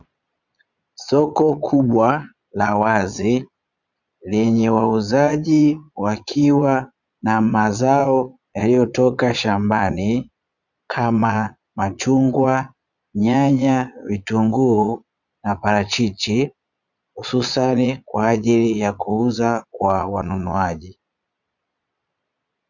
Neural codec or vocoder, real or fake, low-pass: vocoder, 22.05 kHz, 80 mel bands, Vocos; fake; 7.2 kHz